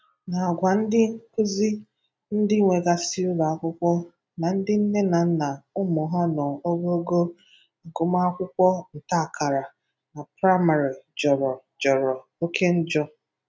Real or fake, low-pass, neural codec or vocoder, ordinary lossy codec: real; none; none; none